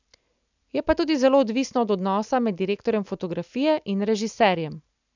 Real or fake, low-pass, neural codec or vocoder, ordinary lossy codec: real; 7.2 kHz; none; none